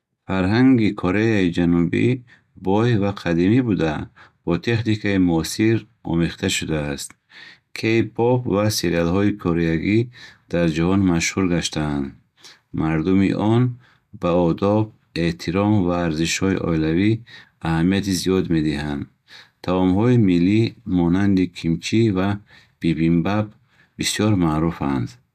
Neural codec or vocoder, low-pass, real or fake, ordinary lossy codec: none; 14.4 kHz; real; none